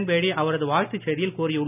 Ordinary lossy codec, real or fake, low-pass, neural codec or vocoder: none; real; 3.6 kHz; none